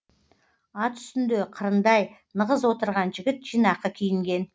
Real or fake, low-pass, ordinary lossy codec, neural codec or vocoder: real; none; none; none